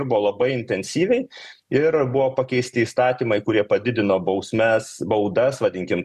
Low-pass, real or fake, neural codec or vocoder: 14.4 kHz; real; none